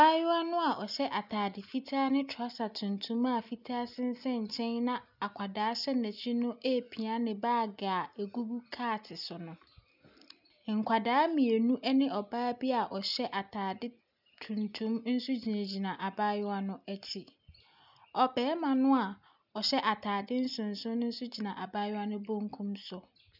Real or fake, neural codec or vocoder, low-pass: real; none; 5.4 kHz